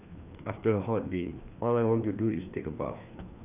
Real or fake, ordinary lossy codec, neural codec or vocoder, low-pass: fake; none; codec, 16 kHz, 2 kbps, FreqCodec, larger model; 3.6 kHz